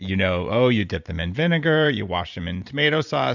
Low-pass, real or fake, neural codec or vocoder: 7.2 kHz; fake; vocoder, 44.1 kHz, 128 mel bands every 512 samples, BigVGAN v2